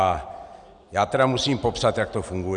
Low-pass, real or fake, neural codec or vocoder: 9.9 kHz; real; none